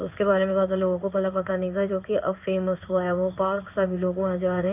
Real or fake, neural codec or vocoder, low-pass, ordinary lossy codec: fake; codec, 16 kHz in and 24 kHz out, 1 kbps, XY-Tokenizer; 3.6 kHz; none